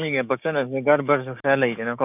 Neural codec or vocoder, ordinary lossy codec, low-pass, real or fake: codec, 16 kHz, 6 kbps, DAC; none; 3.6 kHz; fake